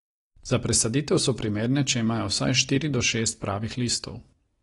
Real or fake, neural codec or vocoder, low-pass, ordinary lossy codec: real; none; 10.8 kHz; AAC, 32 kbps